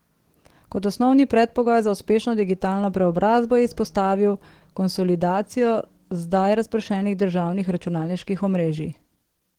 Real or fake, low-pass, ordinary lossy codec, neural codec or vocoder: fake; 19.8 kHz; Opus, 16 kbps; codec, 44.1 kHz, 7.8 kbps, DAC